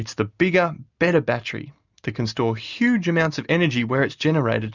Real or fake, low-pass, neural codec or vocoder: real; 7.2 kHz; none